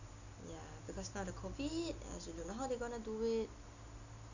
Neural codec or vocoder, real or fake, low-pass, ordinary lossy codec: none; real; 7.2 kHz; none